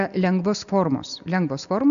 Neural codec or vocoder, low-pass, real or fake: none; 7.2 kHz; real